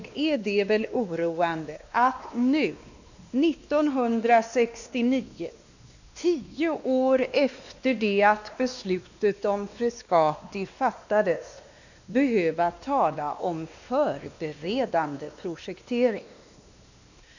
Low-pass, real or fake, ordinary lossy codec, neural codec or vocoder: 7.2 kHz; fake; none; codec, 16 kHz, 2 kbps, X-Codec, WavLM features, trained on Multilingual LibriSpeech